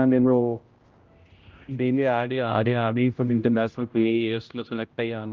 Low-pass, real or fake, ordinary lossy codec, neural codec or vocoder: 7.2 kHz; fake; Opus, 32 kbps; codec, 16 kHz, 0.5 kbps, X-Codec, HuBERT features, trained on general audio